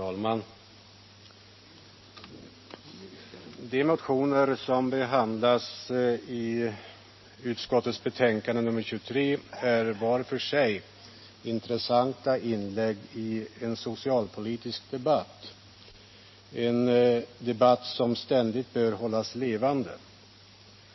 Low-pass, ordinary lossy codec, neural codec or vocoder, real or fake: 7.2 kHz; MP3, 24 kbps; none; real